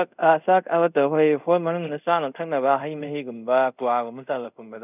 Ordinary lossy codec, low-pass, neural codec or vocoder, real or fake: none; 3.6 kHz; codec, 24 kHz, 0.5 kbps, DualCodec; fake